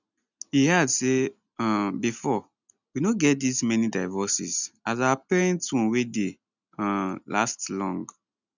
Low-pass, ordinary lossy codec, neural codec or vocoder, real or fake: 7.2 kHz; none; none; real